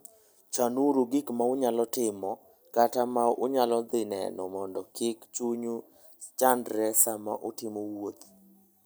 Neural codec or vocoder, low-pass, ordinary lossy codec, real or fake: none; none; none; real